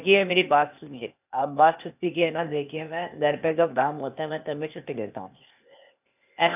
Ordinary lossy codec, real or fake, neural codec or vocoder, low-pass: none; fake; codec, 16 kHz, 0.8 kbps, ZipCodec; 3.6 kHz